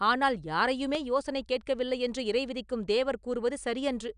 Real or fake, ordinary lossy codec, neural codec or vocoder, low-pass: real; none; none; 9.9 kHz